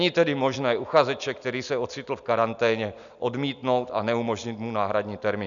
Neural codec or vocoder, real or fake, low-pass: none; real; 7.2 kHz